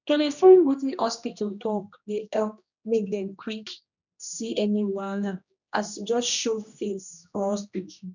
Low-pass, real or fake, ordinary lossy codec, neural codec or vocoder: 7.2 kHz; fake; none; codec, 16 kHz, 1 kbps, X-Codec, HuBERT features, trained on general audio